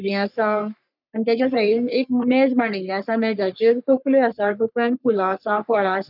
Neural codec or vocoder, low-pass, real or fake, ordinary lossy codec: codec, 44.1 kHz, 3.4 kbps, Pupu-Codec; 5.4 kHz; fake; none